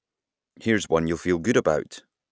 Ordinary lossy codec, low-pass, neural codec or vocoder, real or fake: none; none; none; real